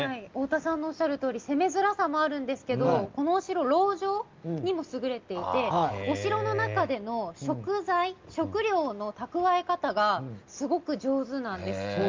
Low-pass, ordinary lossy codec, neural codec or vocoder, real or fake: 7.2 kHz; Opus, 24 kbps; none; real